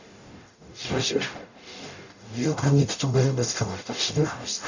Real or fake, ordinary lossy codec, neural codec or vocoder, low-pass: fake; none; codec, 44.1 kHz, 0.9 kbps, DAC; 7.2 kHz